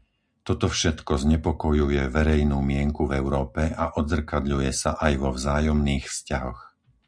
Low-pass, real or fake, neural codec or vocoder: 9.9 kHz; real; none